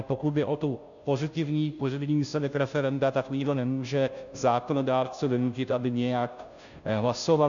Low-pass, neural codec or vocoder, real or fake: 7.2 kHz; codec, 16 kHz, 0.5 kbps, FunCodec, trained on Chinese and English, 25 frames a second; fake